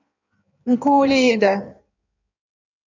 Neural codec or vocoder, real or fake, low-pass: codec, 16 kHz in and 24 kHz out, 1.1 kbps, FireRedTTS-2 codec; fake; 7.2 kHz